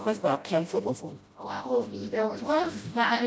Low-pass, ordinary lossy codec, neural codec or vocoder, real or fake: none; none; codec, 16 kHz, 0.5 kbps, FreqCodec, smaller model; fake